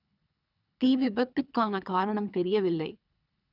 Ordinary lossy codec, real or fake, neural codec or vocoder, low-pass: none; fake; codec, 24 kHz, 3 kbps, HILCodec; 5.4 kHz